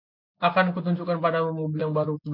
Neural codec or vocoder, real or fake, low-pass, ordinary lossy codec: none; real; 5.4 kHz; MP3, 48 kbps